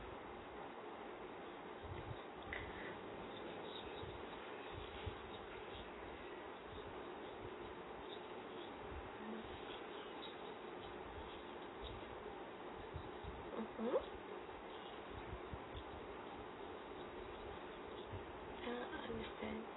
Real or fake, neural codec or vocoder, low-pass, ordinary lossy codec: real; none; 7.2 kHz; AAC, 16 kbps